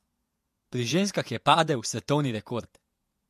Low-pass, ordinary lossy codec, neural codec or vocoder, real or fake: 14.4 kHz; MP3, 64 kbps; vocoder, 48 kHz, 128 mel bands, Vocos; fake